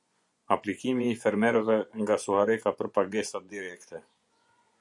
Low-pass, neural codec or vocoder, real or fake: 10.8 kHz; vocoder, 24 kHz, 100 mel bands, Vocos; fake